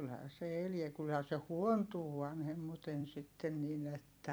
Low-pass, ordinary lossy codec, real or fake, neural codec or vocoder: none; none; fake; vocoder, 44.1 kHz, 128 mel bands every 256 samples, BigVGAN v2